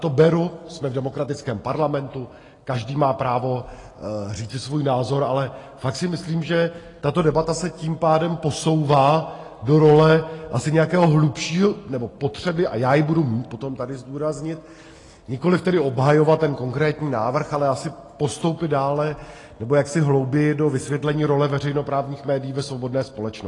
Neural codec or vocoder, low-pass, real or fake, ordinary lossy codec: none; 10.8 kHz; real; AAC, 32 kbps